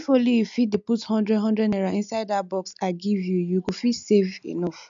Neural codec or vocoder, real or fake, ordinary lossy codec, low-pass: none; real; MP3, 64 kbps; 7.2 kHz